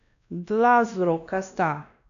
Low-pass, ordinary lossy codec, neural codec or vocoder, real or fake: 7.2 kHz; none; codec, 16 kHz, 1 kbps, X-Codec, WavLM features, trained on Multilingual LibriSpeech; fake